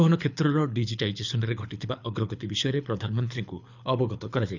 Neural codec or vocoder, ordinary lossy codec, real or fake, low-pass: codec, 24 kHz, 6 kbps, HILCodec; none; fake; 7.2 kHz